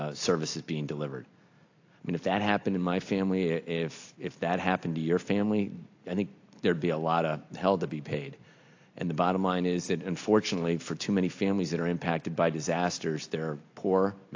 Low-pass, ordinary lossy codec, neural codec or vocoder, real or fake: 7.2 kHz; AAC, 48 kbps; none; real